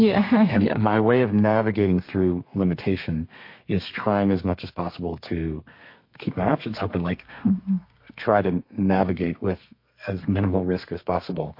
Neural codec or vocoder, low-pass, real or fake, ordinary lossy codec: codec, 32 kHz, 1.9 kbps, SNAC; 5.4 kHz; fake; MP3, 32 kbps